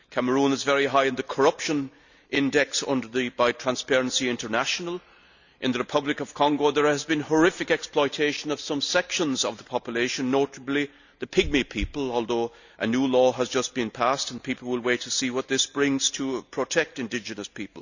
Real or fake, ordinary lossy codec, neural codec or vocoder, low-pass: real; none; none; 7.2 kHz